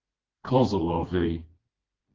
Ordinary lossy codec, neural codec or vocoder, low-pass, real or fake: Opus, 32 kbps; codec, 16 kHz, 2 kbps, FreqCodec, smaller model; 7.2 kHz; fake